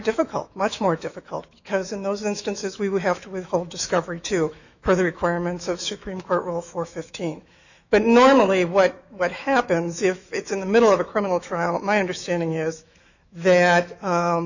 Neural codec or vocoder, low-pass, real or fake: autoencoder, 48 kHz, 128 numbers a frame, DAC-VAE, trained on Japanese speech; 7.2 kHz; fake